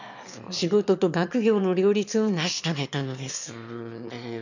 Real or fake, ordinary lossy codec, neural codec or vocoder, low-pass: fake; none; autoencoder, 22.05 kHz, a latent of 192 numbers a frame, VITS, trained on one speaker; 7.2 kHz